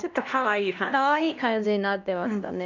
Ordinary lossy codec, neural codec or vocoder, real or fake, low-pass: none; codec, 16 kHz, 1 kbps, X-Codec, HuBERT features, trained on LibriSpeech; fake; 7.2 kHz